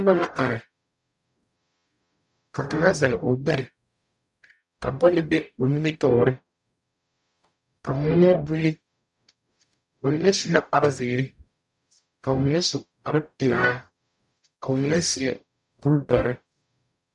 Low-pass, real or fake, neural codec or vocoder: 10.8 kHz; fake; codec, 44.1 kHz, 0.9 kbps, DAC